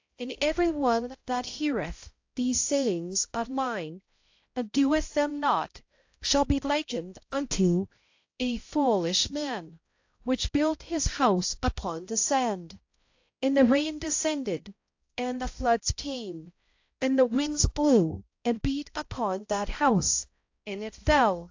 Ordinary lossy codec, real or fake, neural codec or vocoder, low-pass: AAC, 48 kbps; fake; codec, 16 kHz, 0.5 kbps, X-Codec, HuBERT features, trained on balanced general audio; 7.2 kHz